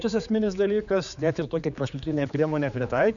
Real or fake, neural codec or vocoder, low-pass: fake; codec, 16 kHz, 4 kbps, X-Codec, HuBERT features, trained on general audio; 7.2 kHz